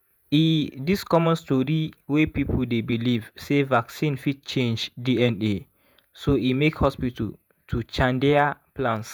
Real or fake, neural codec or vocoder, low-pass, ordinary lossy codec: fake; vocoder, 48 kHz, 128 mel bands, Vocos; none; none